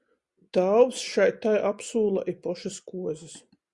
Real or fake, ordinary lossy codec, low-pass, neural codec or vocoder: real; Opus, 64 kbps; 10.8 kHz; none